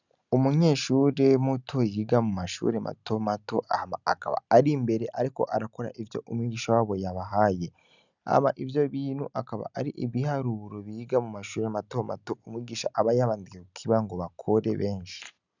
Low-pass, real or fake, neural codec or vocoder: 7.2 kHz; real; none